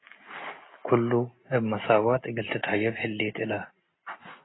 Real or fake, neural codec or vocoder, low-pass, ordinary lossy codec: real; none; 7.2 kHz; AAC, 16 kbps